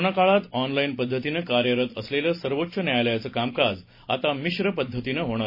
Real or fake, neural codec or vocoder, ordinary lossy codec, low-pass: real; none; MP3, 24 kbps; 5.4 kHz